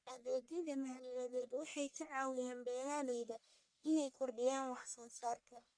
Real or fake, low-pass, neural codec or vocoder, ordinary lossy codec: fake; 9.9 kHz; codec, 44.1 kHz, 1.7 kbps, Pupu-Codec; none